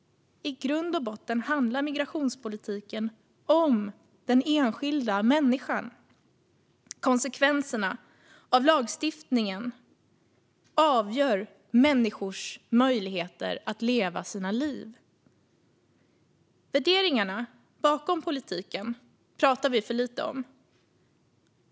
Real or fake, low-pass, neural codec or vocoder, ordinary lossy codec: real; none; none; none